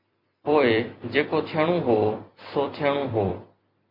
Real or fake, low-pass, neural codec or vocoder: fake; 5.4 kHz; vocoder, 44.1 kHz, 128 mel bands every 256 samples, BigVGAN v2